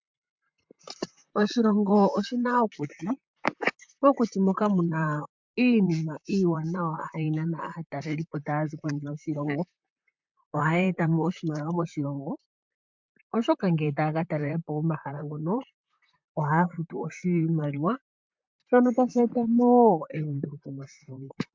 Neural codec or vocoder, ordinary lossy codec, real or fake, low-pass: vocoder, 44.1 kHz, 128 mel bands, Pupu-Vocoder; MP3, 64 kbps; fake; 7.2 kHz